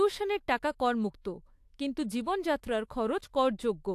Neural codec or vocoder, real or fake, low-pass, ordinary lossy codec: autoencoder, 48 kHz, 128 numbers a frame, DAC-VAE, trained on Japanese speech; fake; 14.4 kHz; AAC, 64 kbps